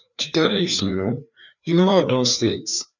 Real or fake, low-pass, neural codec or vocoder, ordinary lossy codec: fake; 7.2 kHz; codec, 16 kHz, 2 kbps, FreqCodec, larger model; none